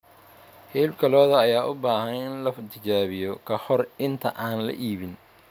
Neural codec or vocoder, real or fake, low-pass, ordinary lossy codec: none; real; none; none